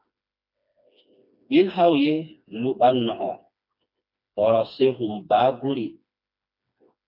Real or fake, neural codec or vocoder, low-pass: fake; codec, 16 kHz, 2 kbps, FreqCodec, smaller model; 5.4 kHz